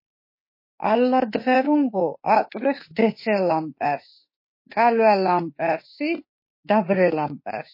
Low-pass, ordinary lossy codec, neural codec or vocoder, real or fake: 5.4 kHz; MP3, 24 kbps; autoencoder, 48 kHz, 32 numbers a frame, DAC-VAE, trained on Japanese speech; fake